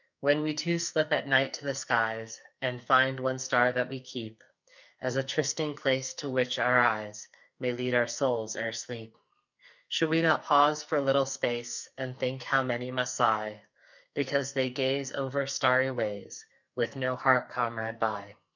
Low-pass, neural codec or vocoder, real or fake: 7.2 kHz; codec, 44.1 kHz, 2.6 kbps, SNAC; fake